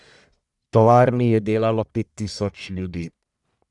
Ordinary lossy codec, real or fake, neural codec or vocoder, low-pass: none; fake; codec, 44.1 kHz, 1.7 kbps, Pupu-Codec; 10.8 kHz